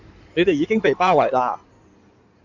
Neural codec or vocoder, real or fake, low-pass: codec, 16 kHz in and 24 kHz out, 2.2 kbps, FireRedTTS-2 codec; fake; 7.2 kHz